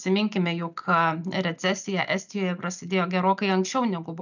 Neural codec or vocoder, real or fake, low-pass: none; real; 7.2 kHz